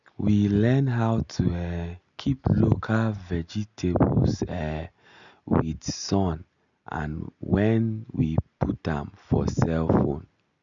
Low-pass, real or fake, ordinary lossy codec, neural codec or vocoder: 7.2 kHz; real; none; none